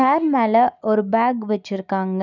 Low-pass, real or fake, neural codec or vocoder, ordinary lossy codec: 7.2 kHz; real; none; none